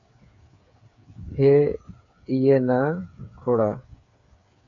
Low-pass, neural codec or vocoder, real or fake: 7.2 kHz; codec, 16 kHz, 8 kbps, FreqCodec, smaller model; fake